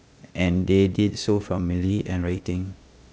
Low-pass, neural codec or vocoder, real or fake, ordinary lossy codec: none; codec, 16 kHz, 0.8 kbps, ZipCodec; fake; none